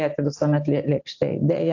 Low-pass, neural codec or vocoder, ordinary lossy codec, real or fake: 7.2 kHz; none; AAC, 48 kbps; real